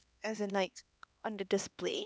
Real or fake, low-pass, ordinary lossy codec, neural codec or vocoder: fake; none; none; codec, 16 kHz, 2 kbps, X-Codec, HuBERT features, trained on LibriSpeech